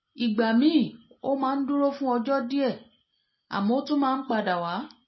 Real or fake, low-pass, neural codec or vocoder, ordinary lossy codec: real; 7.2 kHz; none; MP3, 24 kbps